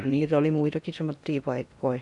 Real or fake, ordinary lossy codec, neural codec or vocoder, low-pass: fake; none; codec, 16 kHz in and 24 kHz out, 0.8 kbps, FocalCodec, streaming, 65536 codes; 10.8 kHz